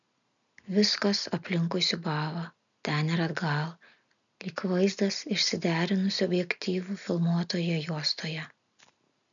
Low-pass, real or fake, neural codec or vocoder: 7.2 kHz; real; none